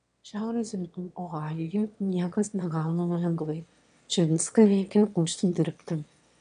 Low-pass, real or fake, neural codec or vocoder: 9.9 kHz; fake; autoencoder, 22.05 kHz, a latent of 192 numbers a frame, VITS, trained on one speaker